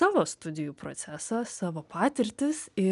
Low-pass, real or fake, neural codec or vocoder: 10.8 kHz; real; none